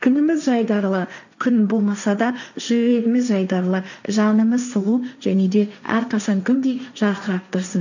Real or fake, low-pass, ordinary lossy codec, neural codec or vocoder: fake; 7.2 kHz; none; codec, 16 kHz, 1.1 kbps, Voila-Tokenizer